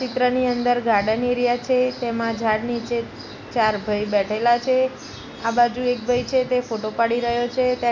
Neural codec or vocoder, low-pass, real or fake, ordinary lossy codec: none; 7.2 kHz; real; none